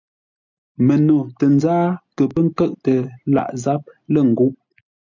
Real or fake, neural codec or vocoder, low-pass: real; none; 7.2 kHz